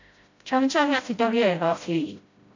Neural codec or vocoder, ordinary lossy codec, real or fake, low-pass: codec, 16 kHz, 0.5 kbps, FreqCodec, smaller model; AAC, 48 kbps; fake; 7.2 kHz